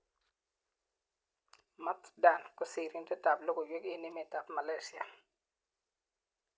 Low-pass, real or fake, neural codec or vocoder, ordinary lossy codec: none; real; none; none